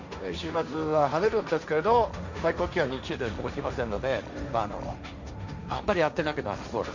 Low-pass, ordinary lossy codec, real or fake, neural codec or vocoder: 7.2 kHz; none; fake; codec, 16 kHz, 1.1 kbps, Voila-Tokenizer